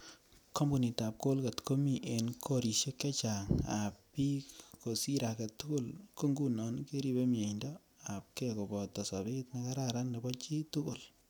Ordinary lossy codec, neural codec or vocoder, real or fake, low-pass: none; none; real; none